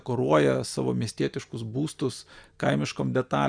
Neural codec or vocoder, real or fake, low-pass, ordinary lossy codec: none; real; 9.9 kHz; Opus, 64 kbps